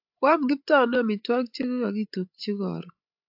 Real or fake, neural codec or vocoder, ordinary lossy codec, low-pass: fake; codec, 16 kHz, 16 kbps, FreqCodec, larger model; AAC, 48 kbps; 5.4 kHz